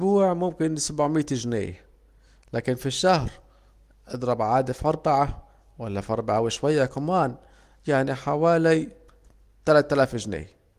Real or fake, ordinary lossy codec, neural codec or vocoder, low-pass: real; Opus, 24 kbps; none; 14.4 kHz